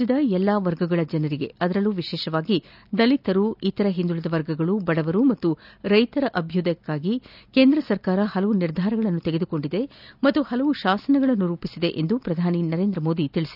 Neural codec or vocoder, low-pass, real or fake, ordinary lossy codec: none; 5.4 kHz; real; none